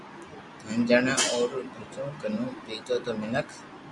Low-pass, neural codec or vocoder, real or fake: 10.8 kHz; none; real